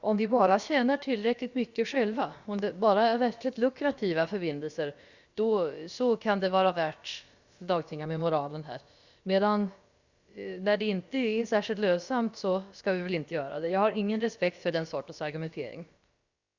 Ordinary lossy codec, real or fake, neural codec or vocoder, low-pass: Opus, 64 kbps; fake; codec, 16 kHz, about 1 kbps, DyCAST, with the encoder's durations; 7.2 kHz